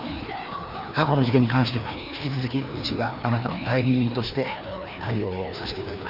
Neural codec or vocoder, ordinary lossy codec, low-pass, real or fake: codec, 16 kHz, 2 kbps, FreqCodec, larger model; none; 5.4 kHz; fake